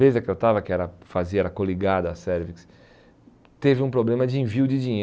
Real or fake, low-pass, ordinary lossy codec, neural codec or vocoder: real; none; none; none